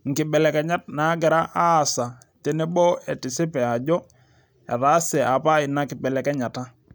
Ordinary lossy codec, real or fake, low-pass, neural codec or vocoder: none; real; none; none